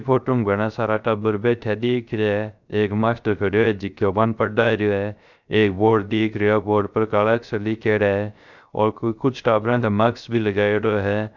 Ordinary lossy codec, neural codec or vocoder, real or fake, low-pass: none; codec, 16 kHz, 0.3 kbps, FocalCodec; fake; 7.2 kHz